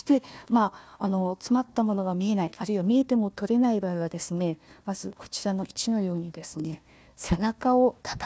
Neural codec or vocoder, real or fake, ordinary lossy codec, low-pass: codec, 16 kHz, 1 kbps, FunCodec, trained on Chinese and English, 50 frames a second; fake; none; none